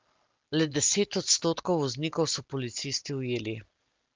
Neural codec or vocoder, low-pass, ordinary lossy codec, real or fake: none; 7.2 kHz; Opus, 24 kbps; real